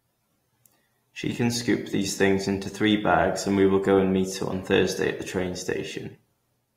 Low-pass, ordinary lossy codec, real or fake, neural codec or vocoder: 19.8 kHz; AAC, 48 kbps; real; none